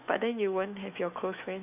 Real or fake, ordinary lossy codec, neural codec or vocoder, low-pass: fake; none; autoencoder, 48 kHz, 128 numbers a frame, DAC-VAE, trained on Japanese speech; 3.6 kHz